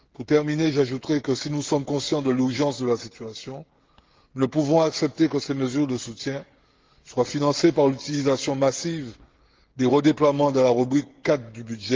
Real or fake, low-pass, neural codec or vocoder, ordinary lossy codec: fake; 7.2 kHz; codec, 16 kHz, 16 kbps, FreqCodec, smaller model; Opus, 16 kbps